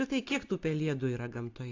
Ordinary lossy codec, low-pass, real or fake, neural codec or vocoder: AAC, 48 kbps; 7.2 kHz; real; none